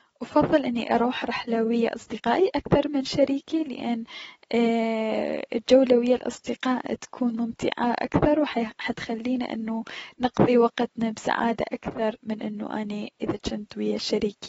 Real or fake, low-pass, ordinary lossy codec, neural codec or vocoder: real; 9.9 kHz; AAC, 24 kbps; none